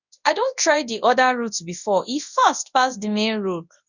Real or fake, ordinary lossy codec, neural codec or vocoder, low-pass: fake; none; codec, 24 kHz, 0.9 kbps, WavTokenizer, large speech release; 7.2 kHz